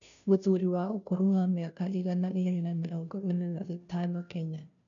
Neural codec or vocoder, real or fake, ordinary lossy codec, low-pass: codec, 16 kHz, 0.5 kbps, FunCodec, trained on Chinese and English, 25 frames a second; fake; none; 7.2 kHz